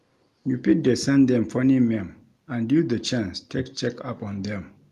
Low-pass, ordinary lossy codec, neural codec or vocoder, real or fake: 14.4 kHz; Opus, 32 kbps; none; real